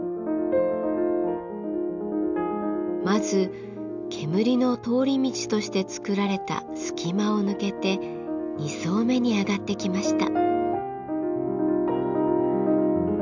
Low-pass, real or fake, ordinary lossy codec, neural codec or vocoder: 7.2 kHz; real; none; none